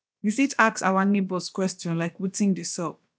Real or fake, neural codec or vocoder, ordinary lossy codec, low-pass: fake; codec, 16 kHz, about 1 kbps, DyCAST, with the encoder's durations; none; none